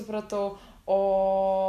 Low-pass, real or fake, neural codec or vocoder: 14.4 kHz; real; none